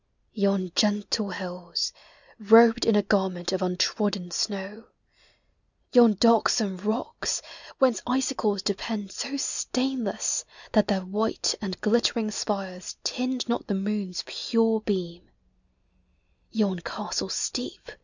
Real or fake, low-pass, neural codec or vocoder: real; 7.2 kHz; none